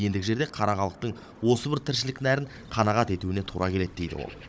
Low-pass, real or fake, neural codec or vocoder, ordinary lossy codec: none; fake; codec, 16 kHz, 16 kbps, FunCodec, trained on Chinese and English, 50 frames a second; none